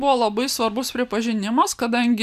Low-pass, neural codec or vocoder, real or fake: 14.4 kHz; none; real